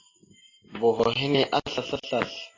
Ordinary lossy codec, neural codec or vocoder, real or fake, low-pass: AAC, 32 kbps; none; real; 7.2 kHz